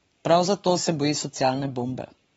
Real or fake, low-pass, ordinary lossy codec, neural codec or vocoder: fake; 19.8 kHz; AAC, 24 kbps; codec, 44.1 kHz, 7.8 kbps, Pupu-Codec